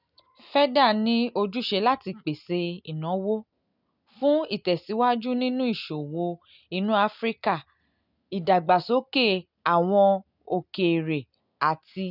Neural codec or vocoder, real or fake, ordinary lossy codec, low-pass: none; real; none; 5.4 kHz